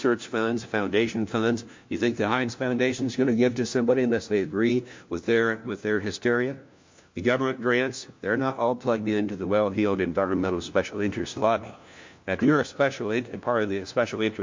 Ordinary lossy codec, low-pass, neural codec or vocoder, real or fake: MP3, 48 kbps; 7.2 kHz; codec, 16 kHz, 1 kbps, FunCodec, trained on LibriTTS, 50 frames a second; fake